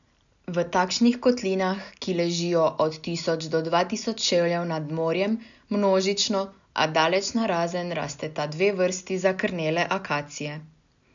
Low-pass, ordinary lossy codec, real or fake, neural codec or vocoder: 7.2 kHz; none; real; none